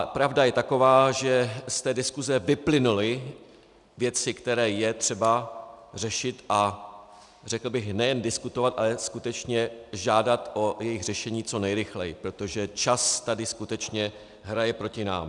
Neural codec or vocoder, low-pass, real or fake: none; 10.8 kHz; real